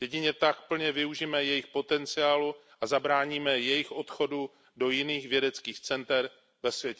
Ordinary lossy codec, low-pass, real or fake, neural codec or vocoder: none; none; real; none